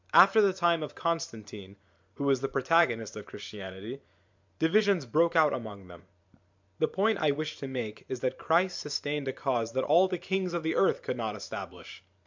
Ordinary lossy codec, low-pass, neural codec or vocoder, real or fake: MP3, 64 kbps; 7.2 kHz; vocoder, 44.1 kHz, 128 mel bands every 512 samples, BigVGAN v2; fake